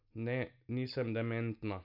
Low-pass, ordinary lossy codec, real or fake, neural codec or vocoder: 5.4 kHz; none; real; none